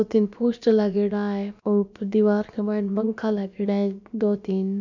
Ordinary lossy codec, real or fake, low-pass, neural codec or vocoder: none; fake; 7.2 kHz; codec, 16 kHz, about 1 kbps, DyCAST, with the encoder's durations